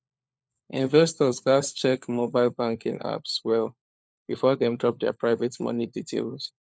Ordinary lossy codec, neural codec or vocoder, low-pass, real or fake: none; codec, 16 kHz, 4 kbps, FunCodec, trained on LibriTTS, 50 frames a second; none; fake